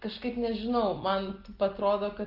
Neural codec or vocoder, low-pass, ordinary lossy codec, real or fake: none; 5.4 kHz; Opus, 32 kbps; real